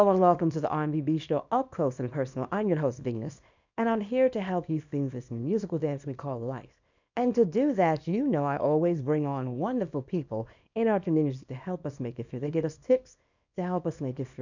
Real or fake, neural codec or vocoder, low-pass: fake; codec, 24 kHz, 0.9 kbps, WavTokenizer, small release; 7.2 kHz